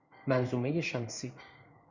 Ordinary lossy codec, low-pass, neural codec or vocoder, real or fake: Opus, 64 kbps; 7.2 kHz; codec, 16 kHz in and 24 kHz out, 1 kbps, XY-Tokenizer; fake